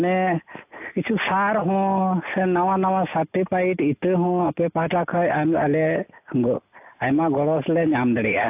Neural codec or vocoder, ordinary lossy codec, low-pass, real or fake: none; AAC, 32 kbps; 3.6 kHz; real